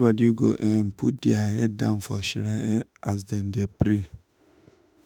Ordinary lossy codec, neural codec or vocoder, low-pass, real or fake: none; autoencoder, 48 kHz, 32 numbers a frame, DAC-VAE, trained on Japanese speech; 19.8 kHz; fake